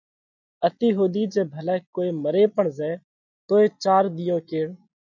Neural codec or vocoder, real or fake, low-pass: none; real; 7.2 kHz